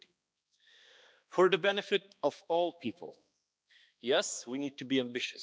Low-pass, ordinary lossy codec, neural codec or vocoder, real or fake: none; none; codec, 16 kHz, 2 kbps, X-Codec, HuBERT features, trained on balanced general audio; fake